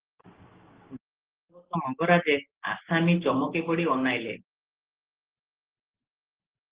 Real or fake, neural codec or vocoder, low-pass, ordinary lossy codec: real; none; 3.6 kHz; Opus, 16 kbps